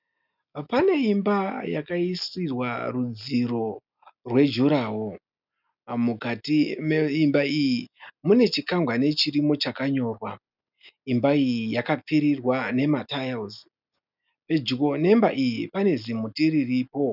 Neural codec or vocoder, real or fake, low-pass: none; real; 5.4 kHz